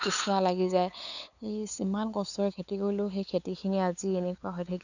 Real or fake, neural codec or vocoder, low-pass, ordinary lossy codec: fake; codec, 16 kHz, 8 kbps, FunCodec, trained on LibriTTS, 25 frames a second; 7.2 kHz; none